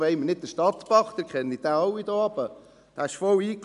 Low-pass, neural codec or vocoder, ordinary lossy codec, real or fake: 10.8 kHz; none; none; real